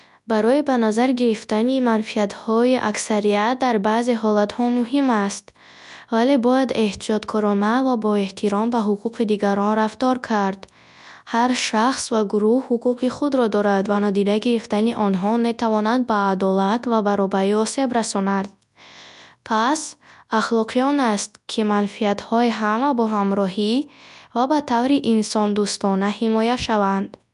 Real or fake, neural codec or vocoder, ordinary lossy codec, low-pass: fake; codec, 24 kHz, 0.9 kbps, WavTokenizer, large speech release; none; 10.8 kHz